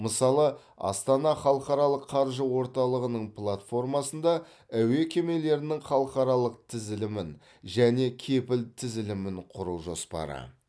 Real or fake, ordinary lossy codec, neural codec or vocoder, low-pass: real; none; none; none